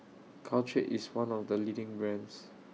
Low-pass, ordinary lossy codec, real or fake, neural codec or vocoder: none; none; real; none